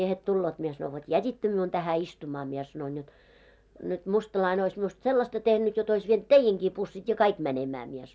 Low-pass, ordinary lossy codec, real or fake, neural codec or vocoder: none; none; real; none